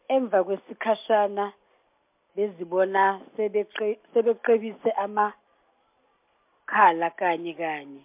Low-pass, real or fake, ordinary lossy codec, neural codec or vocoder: 3.6 kHz; real; MP3, 32 kbps; none